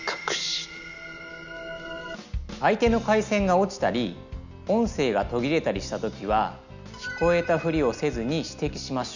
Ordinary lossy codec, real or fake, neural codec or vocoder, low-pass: none; real; none; 7.2 kHz